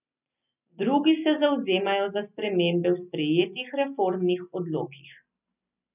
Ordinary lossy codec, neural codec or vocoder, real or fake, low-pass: none; none; real; 3.6 kHz